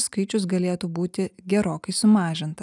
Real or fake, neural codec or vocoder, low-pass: real; none; 10.8 kHz